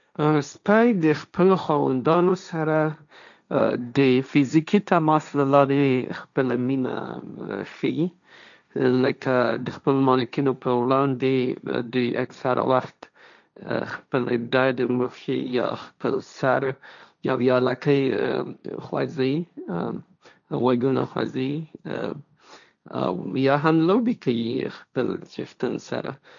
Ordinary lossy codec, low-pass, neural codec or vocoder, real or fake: none; 7.2 kHz; codec, 16 kHz, 1.1 kbps, Voila-Tokenizer; fake